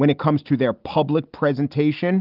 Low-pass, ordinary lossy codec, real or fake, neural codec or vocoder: 5.4 kHz; Opus, 32 kbps; real; none